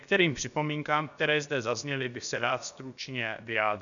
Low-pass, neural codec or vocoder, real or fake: 7.2 kHz; codec, 16 kHz, about 1 kbps, DyCAST, with the encoder's durations; fake